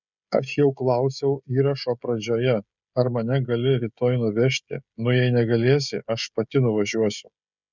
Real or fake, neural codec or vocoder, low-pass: fake; codec, 16 kHz, 16 kbps, FreqCodec, smaller model; 7.2 kHz